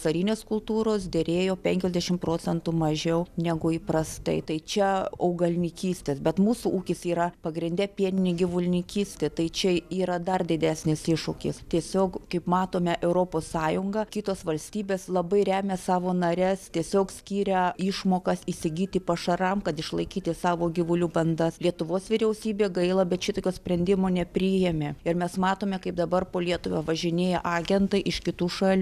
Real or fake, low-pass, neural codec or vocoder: real; 14.4 kHz; none